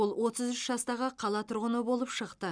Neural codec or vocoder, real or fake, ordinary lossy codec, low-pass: none; real; none; 9.9 kHz